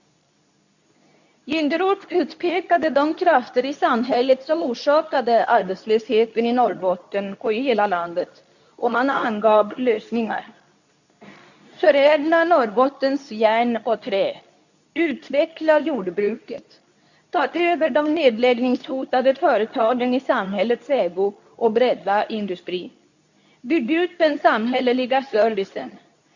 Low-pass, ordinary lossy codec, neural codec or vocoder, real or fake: 7.2 kHz; none; codec, 24 kHz, 0.9 kbps, WavTokenizer, medium speech release version 2; fake